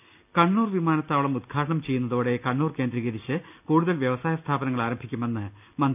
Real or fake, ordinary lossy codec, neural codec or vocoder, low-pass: real; none; none; 3.6 kHz